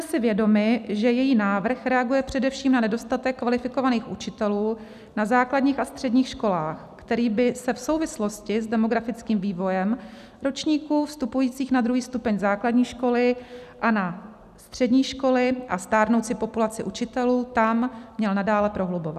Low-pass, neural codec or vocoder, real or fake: 14.4 kHz; none; real